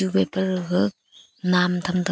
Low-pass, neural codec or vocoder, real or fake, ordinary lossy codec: none; none; real; none